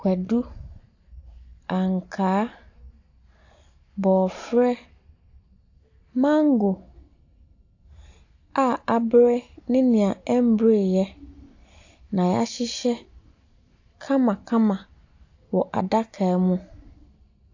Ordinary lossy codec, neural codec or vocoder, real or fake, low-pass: AAC, 48 kbps; none; real; 7.2 kHz